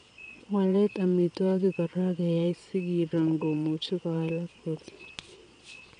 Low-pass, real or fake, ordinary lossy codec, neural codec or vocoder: 9.9 kHz; fake; none; vocoder, 22.05 kHz, 80 mel bands, Vocos